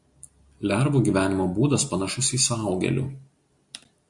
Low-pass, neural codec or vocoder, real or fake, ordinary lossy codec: 10.8 kHz; none; real; MP3, 64 kbps